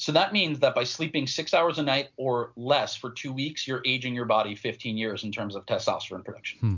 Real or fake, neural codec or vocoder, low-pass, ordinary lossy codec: real; none; 7.2 kHz; MP3, 64 kbps